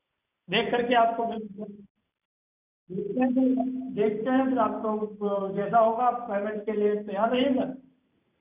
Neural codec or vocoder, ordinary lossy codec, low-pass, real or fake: vocoder, 44.1 kHz, 128 mel bands every 512 samples, BigVGAN v2; none; 3.6 kHz; fake